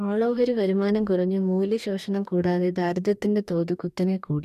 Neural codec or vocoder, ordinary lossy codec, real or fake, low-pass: codec, 44.1 kHz, 2.6 kbps, DAC; none; fake; 14.4 kHz